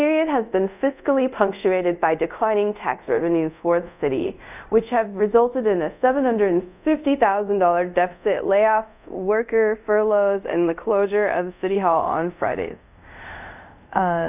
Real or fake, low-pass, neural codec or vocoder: fake; 3.6 kHz; codec, 24 kHz, 0.5 kbps, DualCodec